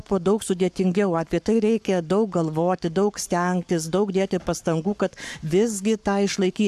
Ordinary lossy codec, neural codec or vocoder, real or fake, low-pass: AAC, 96 kbps; codec, 44.1 kHz, 7.8 kbps, Pupu-Codec; fake; 14.4 kHz